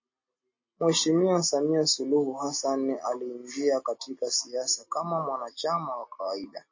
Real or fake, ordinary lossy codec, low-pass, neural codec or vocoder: real; MP3, 32 kbps; 7.2 kHz; none